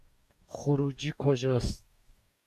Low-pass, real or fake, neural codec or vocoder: 14.4 kHz; fake; codec, 44.1 kHz, 2.6 kbps, DAC